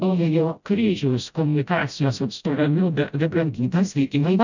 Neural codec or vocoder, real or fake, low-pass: codec, 16 kHz, 0.5 kbps, FreqCodec, smaller model; fake; 7.2 kHz